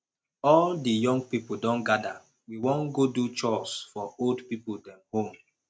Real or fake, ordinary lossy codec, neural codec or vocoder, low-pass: real; none; none; none